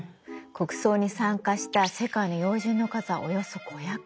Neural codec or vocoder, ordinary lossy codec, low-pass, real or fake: none; none; none; real